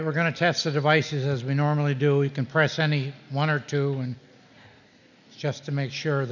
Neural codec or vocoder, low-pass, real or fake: none; 7.2 kHz; real